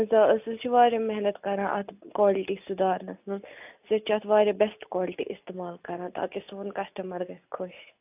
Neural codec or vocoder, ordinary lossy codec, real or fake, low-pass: none; AAC, 32 kbps; real; 3.6 kHz